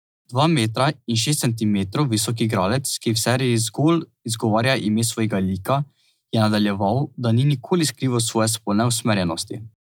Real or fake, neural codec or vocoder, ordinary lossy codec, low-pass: real; none; none; none